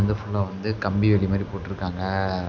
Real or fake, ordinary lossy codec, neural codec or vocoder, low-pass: real; none; none; 7.2 kHz